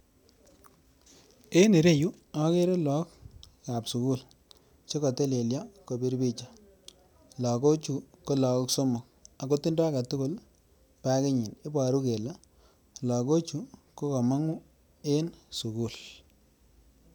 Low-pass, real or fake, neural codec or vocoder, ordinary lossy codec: none; real; none; none